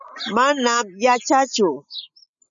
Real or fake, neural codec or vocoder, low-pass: real; none; 7.2 kHz